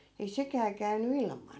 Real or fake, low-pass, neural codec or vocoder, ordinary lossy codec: real; none; none; none